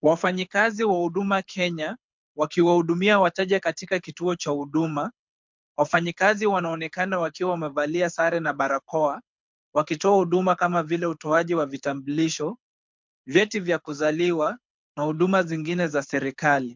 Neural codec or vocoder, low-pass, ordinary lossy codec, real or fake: codec, 24 kHz, 6 kbps, HILCodec; 7.2 kHz; MP3, 64 kbps; fake